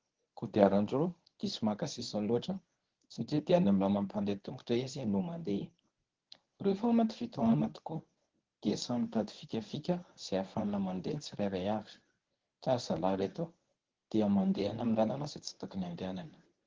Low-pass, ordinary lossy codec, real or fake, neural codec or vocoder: 7.2 kHz; Opus, 16 kbps; fake; codec, 24 kHz, 0.9 kbps, WavTokenizer, medium speech release version 1